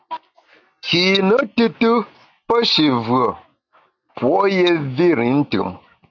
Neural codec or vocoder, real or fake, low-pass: none; real; 7.2 kHz